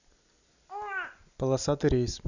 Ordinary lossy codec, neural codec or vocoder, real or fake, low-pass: none; none; real; 7.2 kHz